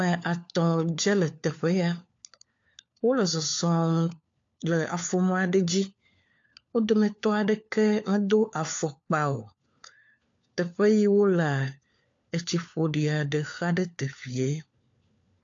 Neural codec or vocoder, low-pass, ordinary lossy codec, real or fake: codec, 16 kHz, 4 kbps, FunCodec, trained on LibriTTS, 50 frames a second; 7.2 kHz; AAC, 48 kbps; fake